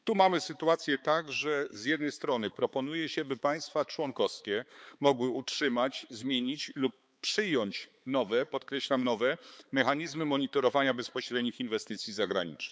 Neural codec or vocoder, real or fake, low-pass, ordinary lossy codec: codec, 16 kHz, 4 kbps, X-Codec, HuBERT features, trained on balanced general audio; fake; none; none